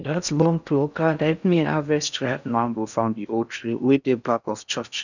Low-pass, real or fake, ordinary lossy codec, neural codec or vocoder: 7.2 kHz; fake; none; codec, 16 kHz in and 24 kHz out, 0.6 kbps, FocalCodec, streaming, 4096 codes